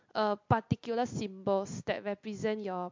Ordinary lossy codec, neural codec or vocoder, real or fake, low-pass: MP3, 48 kbps; none; real; 7.2 kHz